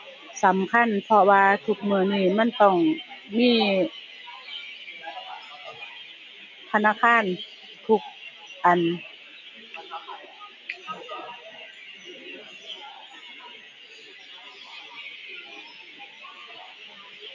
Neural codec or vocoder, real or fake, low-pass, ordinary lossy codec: none; real; 7.2 kHz; none